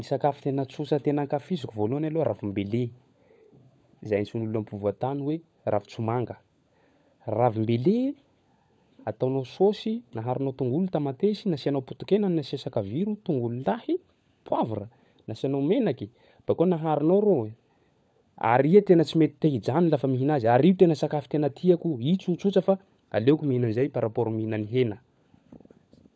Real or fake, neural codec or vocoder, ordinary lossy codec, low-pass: fake; codec, 16 kHz, 16 kbps, FunCodec, trained on LibriTTS, 50 frames a second; none; none